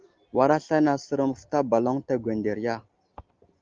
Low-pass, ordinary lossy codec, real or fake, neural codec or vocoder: 7.2 kHz; Opus, 32 kbps; real; none